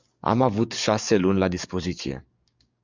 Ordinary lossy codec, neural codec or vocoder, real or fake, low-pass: Opus, 64 kbps; codec, 44.1 kHz, 7.8 kbps, DAC; fake; 7.2 kHz